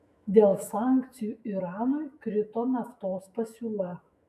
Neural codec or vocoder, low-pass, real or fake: autoencoder, 48 kHz, 128 numbers a frame, DAC-VAE, trained on Japanese speech; 14.4 kHz; fake